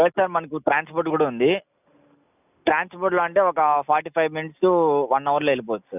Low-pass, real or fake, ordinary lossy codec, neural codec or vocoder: 3.6 kHz; real; none; none